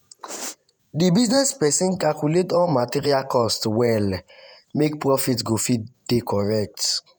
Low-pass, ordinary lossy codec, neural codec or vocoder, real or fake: none; none; vocoder, 48 kHz, 128 mel bands, Vocos; fake